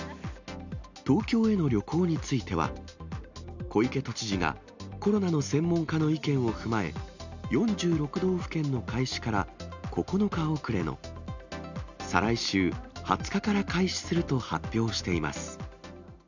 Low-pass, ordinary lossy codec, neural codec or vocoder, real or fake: 7.2 kHz; none; none; real